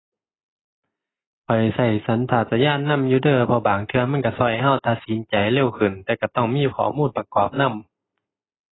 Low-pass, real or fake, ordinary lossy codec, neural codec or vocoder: 7.2 kHz; real; AAC, 16 kbps; none